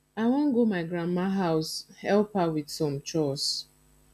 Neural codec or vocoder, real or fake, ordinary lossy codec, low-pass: none; real; none; 14.4 kHz